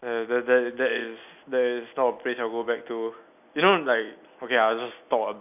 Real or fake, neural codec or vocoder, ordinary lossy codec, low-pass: real; none; none; 3.6 kHz